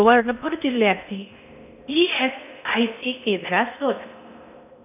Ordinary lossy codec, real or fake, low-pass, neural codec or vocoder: none; fake; 3.6 kHz; codec, 16 kHz in and 24 kHz out, 0.6 kbps, FocalCodec, streaming, 2048 codes